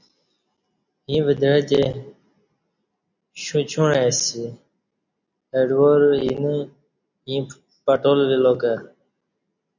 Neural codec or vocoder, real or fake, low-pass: none; real; 7.2 kHz